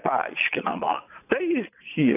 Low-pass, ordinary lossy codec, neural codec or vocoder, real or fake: 3.6 kHz; AAC, 24 kbps; vocoder, 22.05 kHz, 80 mel bands, Vocos; fake